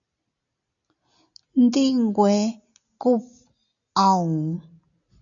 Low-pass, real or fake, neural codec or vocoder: 7.2 kHz; real; none